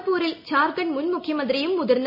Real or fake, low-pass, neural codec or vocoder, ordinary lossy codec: real; 5.4 kHz; none; MP3, 48 kbps